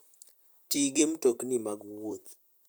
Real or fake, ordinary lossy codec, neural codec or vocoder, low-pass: fake; none; vocoder, 44.1 kHz, 128 mel bands, Pupu-Vocoder; none